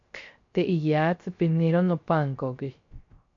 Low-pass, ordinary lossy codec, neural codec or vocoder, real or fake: 7.2 kHz; MP3, 48 kbps; codec, 16 kHz, 0.3 kbps, FocalCodec; fake